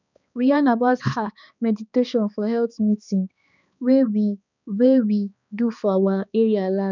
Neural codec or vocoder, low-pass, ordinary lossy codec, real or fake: codec, 16 kHz, 2 kbps, X-Codec, HuBERT features, trained on balanced general audio; 7.2 kHz; none; fake